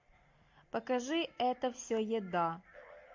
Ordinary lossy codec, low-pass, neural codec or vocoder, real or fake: MP3, 48 kbps; 7.2 kHz; none; real